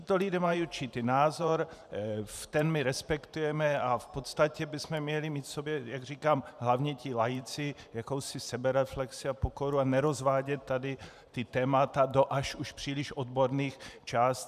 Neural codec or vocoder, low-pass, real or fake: vocoder, 44.1 kHz, 128 mel bands every 512 samples, BigVGAN v2; 14.4 kHz; fake